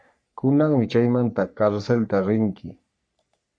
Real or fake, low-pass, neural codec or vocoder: fake; 9.9 kHz; codec, 44.1 kHz, 3.4 kbps, Pupu-Codec